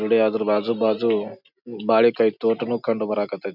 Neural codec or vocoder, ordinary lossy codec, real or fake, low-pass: none; none; real; 5.4 kHz